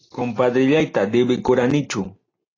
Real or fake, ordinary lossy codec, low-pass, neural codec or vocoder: real; AAC, 32 kbps; 7.2 kHz; none